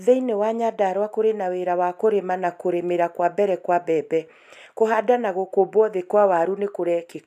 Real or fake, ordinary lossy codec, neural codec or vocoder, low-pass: real; none; none; 14.4 kHz